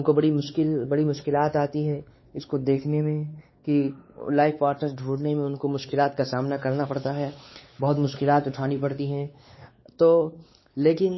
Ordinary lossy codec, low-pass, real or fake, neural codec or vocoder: MP3, 24 kbps; 7.2 kHz; fake; codec, 16 kHz, 4 kbps, X-Codec, WavLM features, trained on Multilingual LibriSpeech